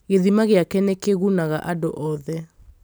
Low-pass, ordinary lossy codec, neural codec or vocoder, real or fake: none; none; none; real